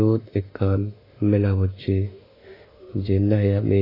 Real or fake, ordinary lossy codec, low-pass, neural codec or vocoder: fake; AAC, 24 kbps; 5.4 kHz; autoencoder, 48 kHz, 32 numbers a frame, DAC-VAE, trained on Japanese speech